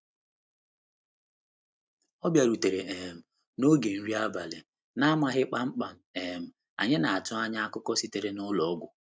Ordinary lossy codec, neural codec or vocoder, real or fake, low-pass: none; none; real; none